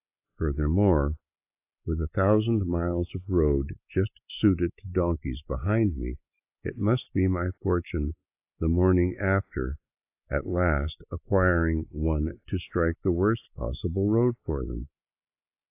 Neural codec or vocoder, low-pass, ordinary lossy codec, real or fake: none; 3.6 kHz; AAC, 32 kbps; real